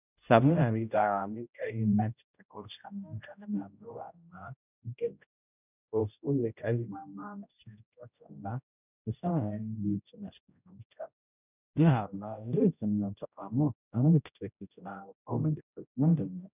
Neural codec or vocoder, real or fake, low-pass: codec, 16 kHz, 0.5 kbps, X-Codec, HuBERT features, trained on general audio; fake; 3.6 kHz